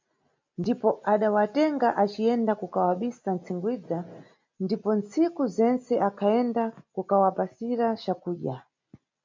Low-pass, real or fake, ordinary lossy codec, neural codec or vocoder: 7.2 kHz; real; MP3, 48 kbps; none